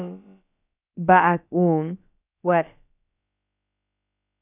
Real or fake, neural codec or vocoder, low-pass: fake; codec, 16 kHz, about 1 kbps, DyCAST, with the encoder's durations; 3.6 kHz